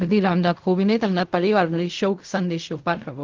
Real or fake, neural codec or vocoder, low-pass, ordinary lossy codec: fake; codec, 16 kHz in and 24 kHz out, 0.4 kbps, LongCat-Audio-Codec, fine tuned four codebook decoder; 7.2 kHz; Opus, 24 kbps